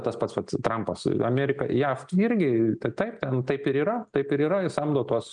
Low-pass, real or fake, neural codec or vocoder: 9.9 kHz; real; none